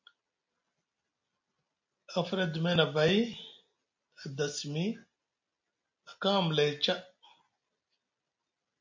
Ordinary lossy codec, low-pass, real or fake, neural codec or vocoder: MP3, 48 kbps; 7.2 kHz; real; none